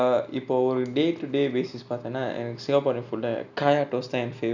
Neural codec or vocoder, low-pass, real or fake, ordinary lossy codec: none; 7.2 kHz; real; none